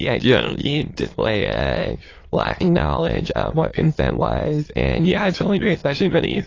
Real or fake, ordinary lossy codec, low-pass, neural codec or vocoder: fake; AAC, 32 kbps; 7.2 kHz; autoencoder, 22.05 kHz, a latent of 192 numbers a frame, VITS, trained on many speakers